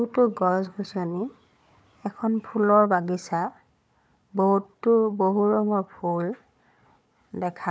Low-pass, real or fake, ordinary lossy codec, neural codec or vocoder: none; fake; none; codec, 16 kHz, 4 kbps, FunCodec, trained on Chinese and English, 50 frames a second